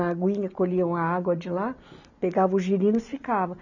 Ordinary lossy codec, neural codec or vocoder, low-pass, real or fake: none; none; 7.2 kHz; real